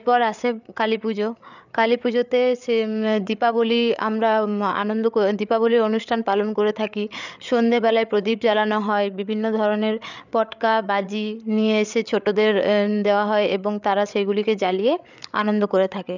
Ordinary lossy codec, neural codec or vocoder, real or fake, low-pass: none; codec, 16 kHz, 8 kbps, FreqCodec, larger model; fake; 7.2 kHz